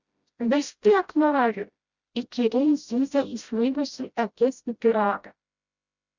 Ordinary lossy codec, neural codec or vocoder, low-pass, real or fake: Opus, 64 kbps; codec, 16 kHz, 0.5 kbps, FreqCodec, smaller model; 7.2 kHz; fake